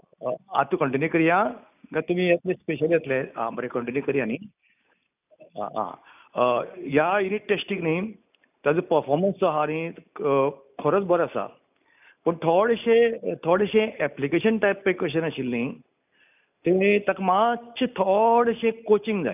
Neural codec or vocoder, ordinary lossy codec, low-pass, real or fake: none; none; 3.6 kHz; real